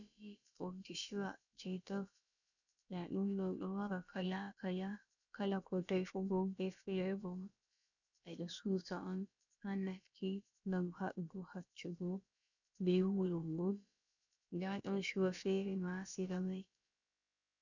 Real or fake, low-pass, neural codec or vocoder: fake; 7.2 kHz; codec, 16 kHz, about 1 kbps, DyCAST, with the encoder's durations